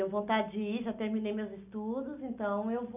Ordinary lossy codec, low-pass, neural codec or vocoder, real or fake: none; 3.6 kHz; none; real